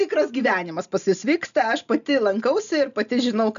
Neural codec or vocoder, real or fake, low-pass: none; real; 7.2 kHz